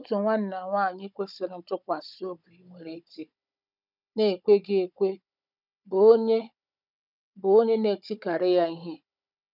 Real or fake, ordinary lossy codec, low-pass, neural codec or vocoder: fake; none; 5.4 kHz; codec, 16 kHz, 16 kbps, FunCodec, trained on Chinese and English, 50 frames a second